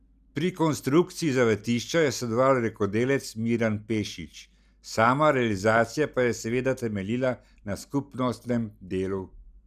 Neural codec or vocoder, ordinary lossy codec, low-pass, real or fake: none; none; 14.4 kHz; real